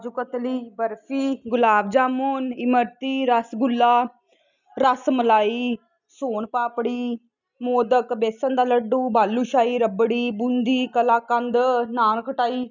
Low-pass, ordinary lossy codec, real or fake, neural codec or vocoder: 7.2 kHz; none; real; none